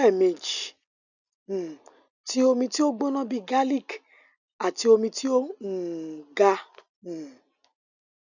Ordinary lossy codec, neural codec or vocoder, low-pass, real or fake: none; none; 7.2 kHz; real